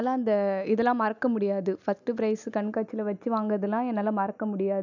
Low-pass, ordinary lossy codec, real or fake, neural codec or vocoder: 7.2 kHz; none; real; none